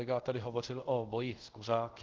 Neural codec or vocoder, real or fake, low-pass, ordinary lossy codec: codec, 24 kHz, 0.5 kbps, DualCodec; fake; 7.2 kHz; Opus, 16 kbps